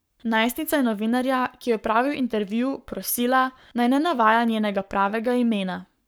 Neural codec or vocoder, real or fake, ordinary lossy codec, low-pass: codec, 44.1 kHz, 7.8 kbps, Pupu-Codec; fake; none; none